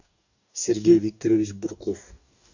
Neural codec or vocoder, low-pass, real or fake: codec, 44.1 kHz, 2.6 kbps, DAC; 7.2 kHz; fake